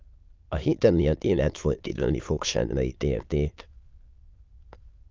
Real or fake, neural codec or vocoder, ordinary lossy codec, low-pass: fake; autoencoder, 22.05 kHz, a latent of 192 numbers a frame, VITS, trained on many speakers; Opus, 32 kbps; 7.2 kHz